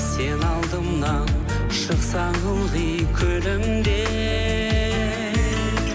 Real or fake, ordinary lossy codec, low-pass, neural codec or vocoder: real; none; none; none